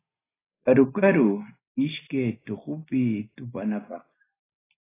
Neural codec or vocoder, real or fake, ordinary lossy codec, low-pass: none; real; AAC, 16 kbps; 3.6 kHz